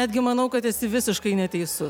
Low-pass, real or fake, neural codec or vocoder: 19.8 kHz; fake; vocoder, 44.1 kHz, 128 mel bands every 256 samples, BigVGAN v2